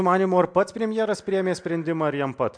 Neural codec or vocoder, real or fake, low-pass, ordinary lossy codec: none; real; 9.9 kHz; MP3, 64 kbps